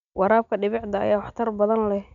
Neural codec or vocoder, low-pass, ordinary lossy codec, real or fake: none; 7.2 kHz; none; real